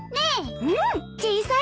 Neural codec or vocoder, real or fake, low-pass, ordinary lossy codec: none; real; none; none